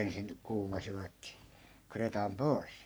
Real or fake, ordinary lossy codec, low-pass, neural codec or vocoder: fake; none; none; codec, 44.1 kHz, 3.4 kbps, Pupu-Codec